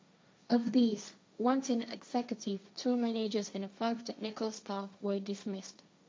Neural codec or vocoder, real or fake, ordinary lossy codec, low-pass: codec, 16 kHz, 1.1 kbps, Voila-Tokenizer; fake; none; none